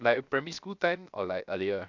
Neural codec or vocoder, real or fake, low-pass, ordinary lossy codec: codec, 16 kHz, 0.7 kbps, FocalCodec; fake; 7.2 kHz; none